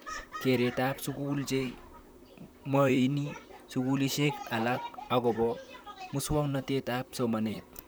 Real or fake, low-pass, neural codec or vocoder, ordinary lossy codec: fake; none; vocoder, 44.1 kHz, 128 mel bands, Pupu-Vocoder; none